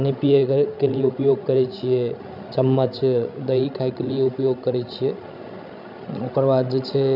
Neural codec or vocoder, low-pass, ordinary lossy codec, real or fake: codec, 16 kHz, 16 kbps, FreqCodec, larger model; 5.4 kHz; none; fake